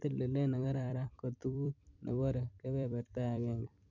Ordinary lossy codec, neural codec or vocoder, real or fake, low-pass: none; vocoder, 44.1 kHz, 128 mel bands every 256 samples, BigVGAN v2; fake; 7.2 kHz